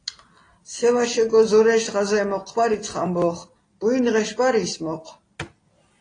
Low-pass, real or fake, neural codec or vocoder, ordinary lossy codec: 9.9 kHz; real; none; AAC, 32 kbps